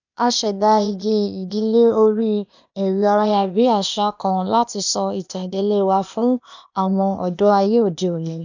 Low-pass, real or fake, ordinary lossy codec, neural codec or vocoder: 7.2 kHz; fake; none; codec, 16 kHz, 0.8 kbps, ZipCodec